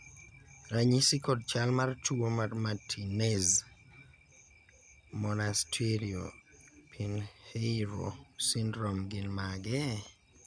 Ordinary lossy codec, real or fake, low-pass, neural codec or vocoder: none; real; 9.9 kHz; none